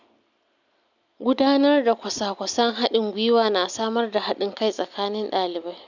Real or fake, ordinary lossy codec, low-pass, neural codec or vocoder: real; none; 7.2 kHz; none